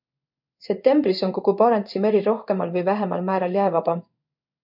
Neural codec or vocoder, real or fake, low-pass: codec, 16 kHz in and 24 kHz out, 1 kbps, XY-Tokenizer; fake; 5.4 kHz